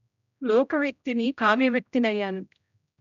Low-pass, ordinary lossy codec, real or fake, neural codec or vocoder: 7.2 kHz; none; fake; codec, 16 kHz, 0.5 kbps, X-Codec, HuBERT features, trained on general audio